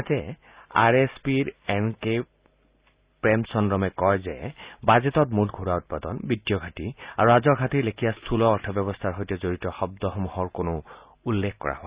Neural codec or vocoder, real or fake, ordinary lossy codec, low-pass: none; real; Opus, 64 kbps; 3.6 kHz